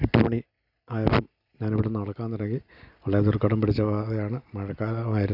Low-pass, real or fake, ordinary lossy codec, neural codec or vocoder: 5.4 kHz; real; none; none